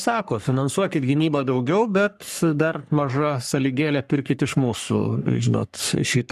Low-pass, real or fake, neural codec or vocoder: 14.4 kHz; fake; codec, 44.1 kHz, 3.4 kbps, Pupu-Codec